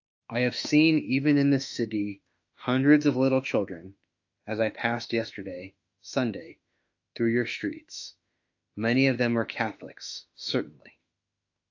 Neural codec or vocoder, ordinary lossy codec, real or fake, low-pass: autoencoder, 48 kHz, 32 numbers a frame, DAC-VAE, trained on Japanese speech; AAC, 48 kbps; fake; 7.2 kHz